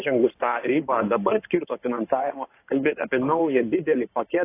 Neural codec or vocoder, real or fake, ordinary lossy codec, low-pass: codec, 16 kHz in and 24 kHz out, 2.2 kbps, FireRedTTS-2 codec; fake; AAC, 24 kbps; 3.6 kHz